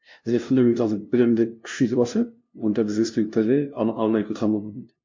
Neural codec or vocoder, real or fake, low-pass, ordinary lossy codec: codec, 16 kHz, 0.5 kbps, FunCodec, trained on LibriTTS, 25 frames a second; fake; 7.2 kHz; MP3, 48 kbps